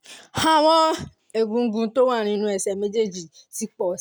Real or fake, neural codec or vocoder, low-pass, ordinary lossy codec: real; none; none; none